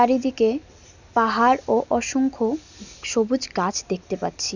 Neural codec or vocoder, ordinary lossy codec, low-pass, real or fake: none; none; 7.2 kHz; real